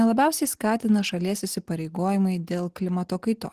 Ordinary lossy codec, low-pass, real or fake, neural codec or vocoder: Opus, 16 kbps; 14.4 kHz; real; none